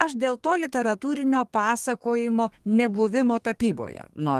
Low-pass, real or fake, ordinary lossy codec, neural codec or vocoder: 14.4 kHz; fake; Opus, 24 kbps; codec, 32 kHz, 1.9 kbps, SNAC